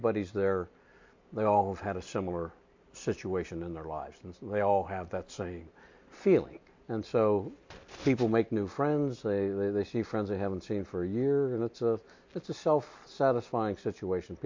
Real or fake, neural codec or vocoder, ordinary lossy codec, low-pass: real; none; MP3, 48 kbps; 7.2 kHz